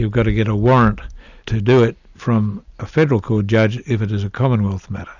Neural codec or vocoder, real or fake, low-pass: none; real; 7.2 kHz